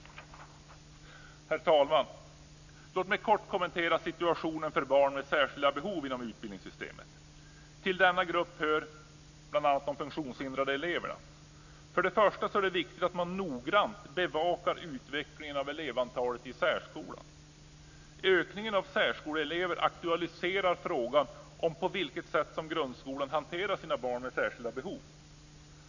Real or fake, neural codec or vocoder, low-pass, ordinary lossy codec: real; none; 7.2 kHz; none